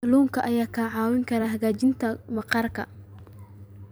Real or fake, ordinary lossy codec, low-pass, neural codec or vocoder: fake; none; none; vocoder, 44.1 kHz, 128 mel bands every 256 samples, BigVGAN v2